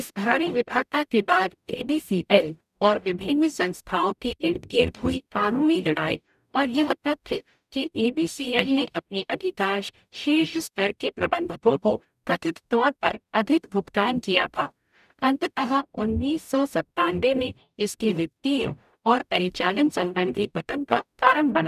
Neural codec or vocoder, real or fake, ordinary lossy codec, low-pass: codec, 44.1 kHz, 0.9 kbps, DAC; fake; none; 14.4 kHz